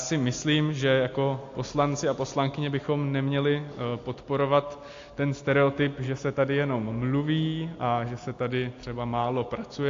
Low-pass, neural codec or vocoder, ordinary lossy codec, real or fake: 7.2 kHz; none; AAC, 48 kbps; real